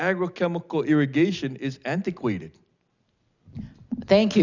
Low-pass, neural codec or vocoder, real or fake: 7.2 kHz; none; real